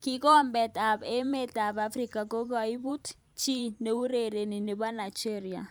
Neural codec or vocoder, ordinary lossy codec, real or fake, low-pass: vocoder, 44.1 kHz, 128 mel bands every 512 samples, BigVGAN v2; none; fake; none